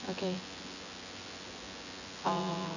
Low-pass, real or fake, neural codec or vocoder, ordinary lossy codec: 7.2 kHz; fake; vocoder, 24 kHz, 100 mel bands, Vocos; none